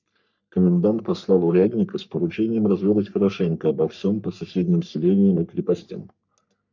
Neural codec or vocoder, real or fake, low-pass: codec, 44.1 kHz, 3.4 kbps, Pupu-Codec; fake; 7.2 kHz